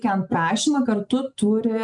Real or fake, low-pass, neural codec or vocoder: real; 10.8 kHz; none